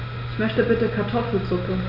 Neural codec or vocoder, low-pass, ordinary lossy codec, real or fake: none; 5.4 kHz; none; real